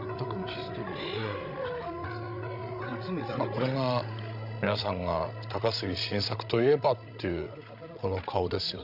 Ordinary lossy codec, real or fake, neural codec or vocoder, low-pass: none; fake; codec, 16 kHz, 16 kbps, FreqCodec, larger model; 5.4 kHz